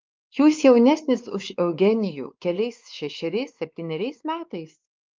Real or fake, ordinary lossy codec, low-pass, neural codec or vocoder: fake; Opus, 32 kbps; 7.2 kHz; codec, 16 kHz, 4 kbps, X-Codec, WavLM features, trained on Multilingual LibriSpeech